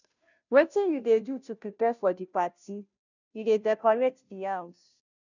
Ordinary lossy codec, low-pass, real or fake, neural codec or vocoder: none; 7.2 kHz; fake; codec, 16 kHz, 0.5 kbps, FunCodec, trained on Chinese and English, 25 frames a second